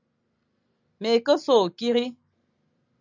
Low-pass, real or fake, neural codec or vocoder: 7.2 kHz; real; none